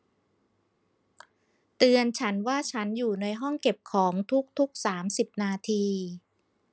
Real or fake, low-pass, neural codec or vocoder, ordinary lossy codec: real; none; none; none